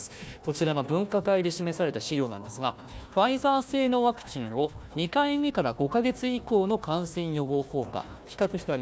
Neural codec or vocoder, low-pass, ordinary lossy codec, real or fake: codec, 16 kHz, 1 kbps, FunCodec, trained on Chinese and English, 50 frames a second; none; none; fake